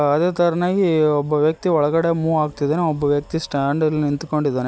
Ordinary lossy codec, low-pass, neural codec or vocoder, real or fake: none; none; none; real